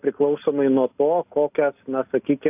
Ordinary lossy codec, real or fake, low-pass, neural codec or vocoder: AAC, 32 kbps; real; 3.6 kHz; none